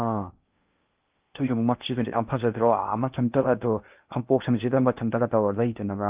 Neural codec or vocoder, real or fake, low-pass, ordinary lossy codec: codec, 16 kHz in and 24 kHz out, 0.6 kbps, FocalCodec, streaming, 2048 codes; fake; 3.6 kHz; Opus, 32 kbps